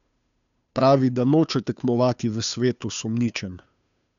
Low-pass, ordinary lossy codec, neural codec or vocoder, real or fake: 7.2 kHz; none; codec, 16 kHz, 2 kbps, FunCodec, trained on Chinese and English, 25 frames a second; fake